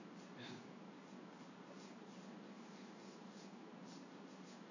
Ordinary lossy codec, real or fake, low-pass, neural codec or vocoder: none; fake; 7.2 kHz; codec, 16 kHz in and 24 kHz out, 1 kbps, XY-Tokenizer